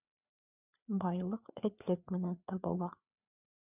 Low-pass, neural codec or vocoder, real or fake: 3.6 kHz; codec, 16 kHz, 4 kbps, FreqCodec, larger model; fake